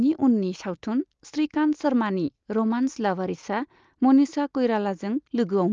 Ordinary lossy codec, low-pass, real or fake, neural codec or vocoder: Opus, 24 kbps; 7.2 kHz; real; none